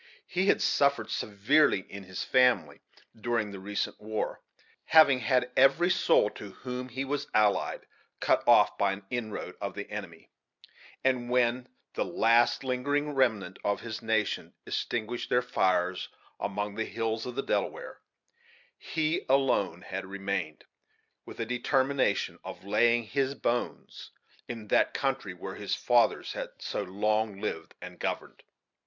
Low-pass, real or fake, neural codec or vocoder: 7.2 kHz; real; none